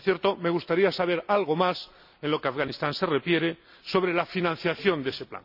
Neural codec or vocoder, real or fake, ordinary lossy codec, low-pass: none; real; none; 5.4 kHz